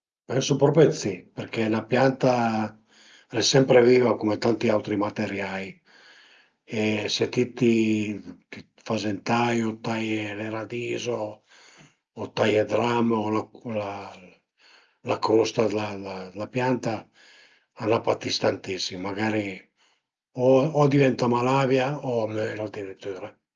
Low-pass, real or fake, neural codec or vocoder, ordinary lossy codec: 7.2 kHz; real; none; Opus, 24 kbps